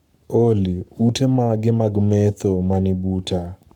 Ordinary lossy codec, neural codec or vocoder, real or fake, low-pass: none; codec, 44.1 kHz, 7.8 kbps, Pupu-Codec; fake; 19.8 kHz